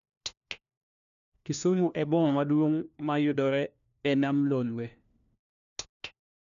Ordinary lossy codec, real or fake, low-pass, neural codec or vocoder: none; fake; 7.2 kHz; codec, 16 kHz, 1 kbps, FunCodec, trained on LibriTTS, 50 frames a second